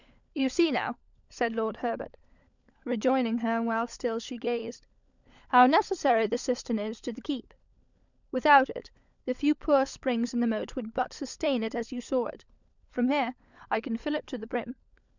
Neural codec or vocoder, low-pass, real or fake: codec, 16 kHz, 16 kbps, FunCodec, trained on LibriTTS, 50 frames a second; 7.2 kHz; fake